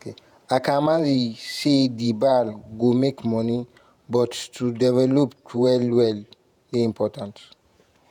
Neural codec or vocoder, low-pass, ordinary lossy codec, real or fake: vocoder, 48 kHz, 128 mel bands, Vocos; 19.8 kHz; none; fake